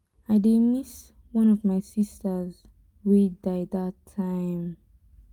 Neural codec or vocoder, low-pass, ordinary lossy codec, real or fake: none; 19.8 kHz; Opus, 32 kbps; real